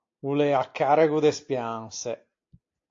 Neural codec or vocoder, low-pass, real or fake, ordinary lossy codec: none; 7.2 kHz; real; AAC, 48 kbps